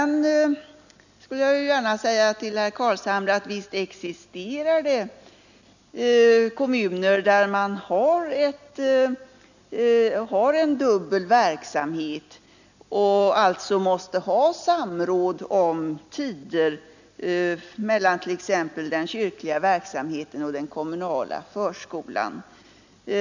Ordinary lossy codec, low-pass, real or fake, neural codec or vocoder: none; 7.2 kHz; real; none